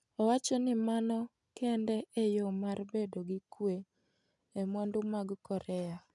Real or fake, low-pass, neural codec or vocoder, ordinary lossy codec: real; 10.8 kHz; none; none